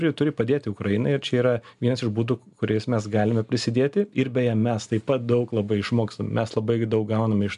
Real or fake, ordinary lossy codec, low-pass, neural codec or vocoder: real; MP3, 96 kbps; 10.8 kHz; none